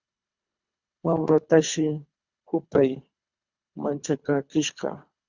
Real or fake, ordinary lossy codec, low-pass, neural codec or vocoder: fake; Opus, 64 kbps; 7.2 kHz; codec, 24 kHz, 3 kbps, HILCodec